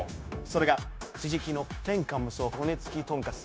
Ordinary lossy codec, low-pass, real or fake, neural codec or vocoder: none; none; fake; codec, 16 kHz, 0.9 kbps, LongCat-Audio-Codec